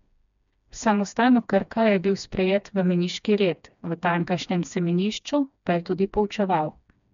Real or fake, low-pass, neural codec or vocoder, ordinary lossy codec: fake; 7.2 kHz; codec, 16 kHz, 2 kbps, FreqCodec, smaller model; none